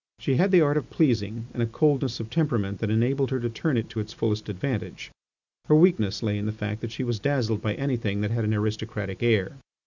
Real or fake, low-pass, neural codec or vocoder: real; 7.2 kHz; none